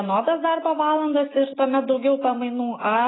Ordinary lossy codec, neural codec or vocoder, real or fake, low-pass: AAC, 16 kbps; none; real; 7.2 kHz